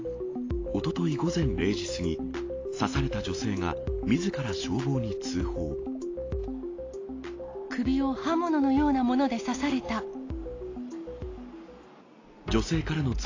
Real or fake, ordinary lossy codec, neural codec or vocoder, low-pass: real; AAC, 32 kbps; none; 7.2 kHz